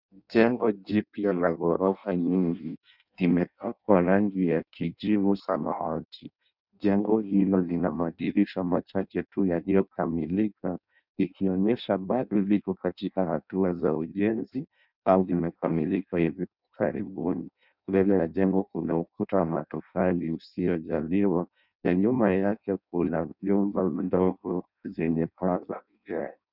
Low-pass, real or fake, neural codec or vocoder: 5.4 kHz; fake; codec, 16 kHz in and 24 kHz out, 0.6 kbps, FireRedTTS-2 codec